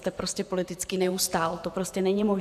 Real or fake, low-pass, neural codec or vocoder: fake; 14.4 kHz; vocoder, 48 kHz, 128 mel bands, Vocos